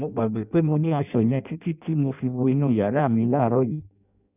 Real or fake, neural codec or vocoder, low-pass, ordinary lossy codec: fake; codec, 16 kHz in and 24 kHz out, 0.6 kbps, FireRedTTS-2 codec; 3.6 kHz; none